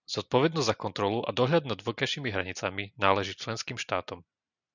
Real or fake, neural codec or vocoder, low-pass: real; none; 7.2 kHz